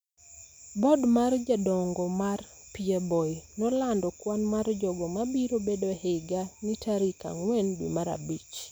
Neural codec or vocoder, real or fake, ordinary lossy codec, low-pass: none; real; none; none